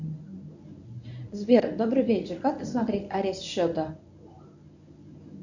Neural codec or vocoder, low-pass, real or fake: codec, 24 kHz, 0.9 kbps, WavTokenizer, medium speech release version 1; 7.2 kHz; fake